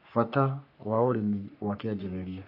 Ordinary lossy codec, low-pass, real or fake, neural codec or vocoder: AAC, 48 kbps; 5.4 kHz; fake; codec, 44.1 kHz, 3.4 kbps, Pupu-Codec